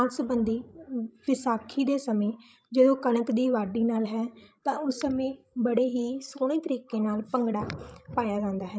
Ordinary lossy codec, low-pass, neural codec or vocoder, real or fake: none; none; codec, 16 kHz, 16 kbps, FreqCodec, larger model; fake